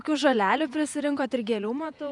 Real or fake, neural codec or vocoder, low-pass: fake; vocoder, 48 kHz, 128 mel bands, Vocos; 10.8 kHz